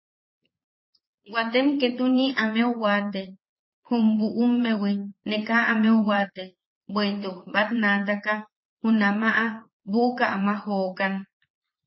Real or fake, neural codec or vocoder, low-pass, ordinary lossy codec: fake; vocoder, 22.05 kHz, 80 mel bands, Vocos; 7.2 kHz; MP3, 24 kbps